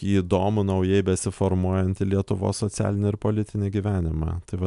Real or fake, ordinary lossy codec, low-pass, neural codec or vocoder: real; MP3, 96 kbps; 10.8 kHz; none